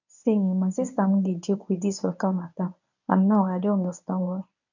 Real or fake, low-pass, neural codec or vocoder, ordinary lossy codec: fake; 7.2 kHz; codec, 24 kHz, 0.9 kbps, WavTokenizer, medium speech release version 1; none